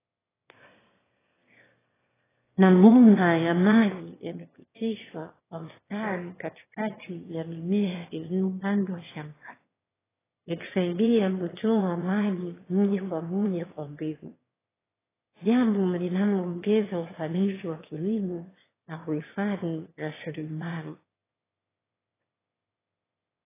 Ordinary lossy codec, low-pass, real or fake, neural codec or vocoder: AAC, 16 kbps; 3.6 kHz; fake; autoencoder, 22.05 kHz, a latent of 192 numbers a frame, VITS, trained on one speaker